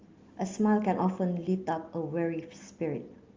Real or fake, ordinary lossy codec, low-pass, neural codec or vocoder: real; Opus, 32 kbps; 7.2 kHz; none